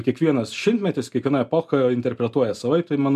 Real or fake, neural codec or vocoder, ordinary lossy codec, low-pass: real; none; MP3, 96 kbps; 14.4 kHz